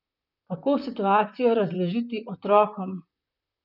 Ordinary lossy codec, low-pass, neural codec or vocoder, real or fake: none; 5.4 kHz; vocoder, 44.1 kHz, 128 mel bands, Pupu-Vocoder; fake